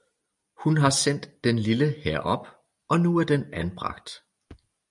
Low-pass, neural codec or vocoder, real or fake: 10.8 kHz; none; real